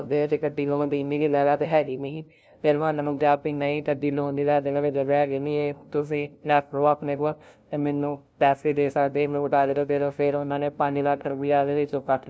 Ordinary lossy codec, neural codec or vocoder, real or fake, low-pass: none; codec, 16 kHz, 0.5 kbps, FunCodec, trained on LibriTTS, 25 frames a second; fake; none